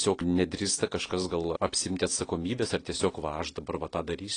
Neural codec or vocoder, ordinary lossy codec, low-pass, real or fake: none; AAC, 32 kbps; 9.9 kHz; real